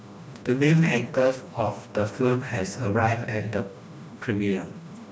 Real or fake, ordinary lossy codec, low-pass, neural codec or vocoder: fake; none; none; codec, 16 kHz, 1 kbps, FreqCodec, smaller model